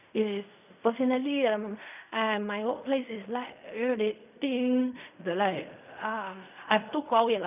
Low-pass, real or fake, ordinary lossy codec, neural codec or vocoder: 3.6 kHz; fake; none; codec, 16 kHz in and 24 kHz out, 0.4 kbps, LongCat-Audio-Codec, fine tuned four codebook decoder